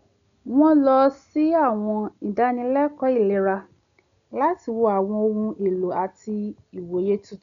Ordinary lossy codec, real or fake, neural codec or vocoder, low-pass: none; real; none; 7.2 kHz